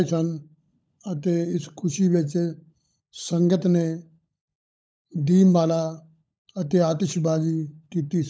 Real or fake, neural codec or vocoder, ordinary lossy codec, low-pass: fake; codec, 16 kHz, 16 kbps, FunCodec, trained on LibriTTS, 50 frames a second; none; none